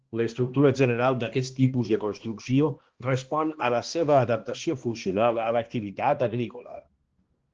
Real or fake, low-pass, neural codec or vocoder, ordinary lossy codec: fake; 7.2 kHz; codec, 16 kHz, 1 kbps, X-Codec, HuBERT features, trained on balanced general audio; Opus, 16 kbps